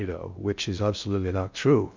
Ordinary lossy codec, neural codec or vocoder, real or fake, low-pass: MP3, 64 kbps; codec, 16 kHz in and 24 kHz out, 0.6 kbps, FocalCodec, streaming, 2048 codes; fake; 7.2 kHz